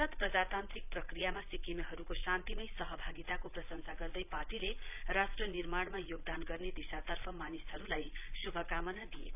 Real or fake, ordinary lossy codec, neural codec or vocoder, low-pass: fake; none; vocoder, 22.05 kHz, 80 mel bands, Vocos; 3.6 kHz